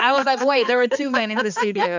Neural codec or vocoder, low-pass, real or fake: codec, 16 kHz, 2 kbps, X-Codec, HuBERT features, trained on balanced general audio; 7.2 kHz; fake